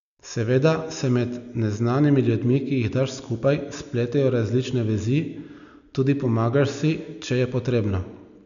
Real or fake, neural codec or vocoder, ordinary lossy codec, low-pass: real; none; none; 7.2 kHz